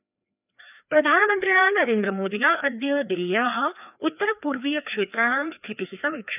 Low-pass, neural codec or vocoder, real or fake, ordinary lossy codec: 3.6 kHz; codec, 16 kHz, 2 kbps, FreqCodec, larger model; fake; none